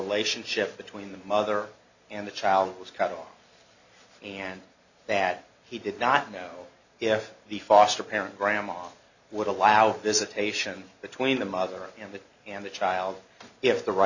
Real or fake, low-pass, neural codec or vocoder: real; 7.2 kHz; none